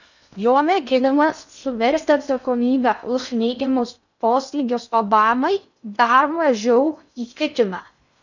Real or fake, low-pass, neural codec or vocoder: fake; 7.2 kHz; codec, 16 kHz in and 24 kHz out, 0.6 kbps, FocalCodec, streaming, 2048 codes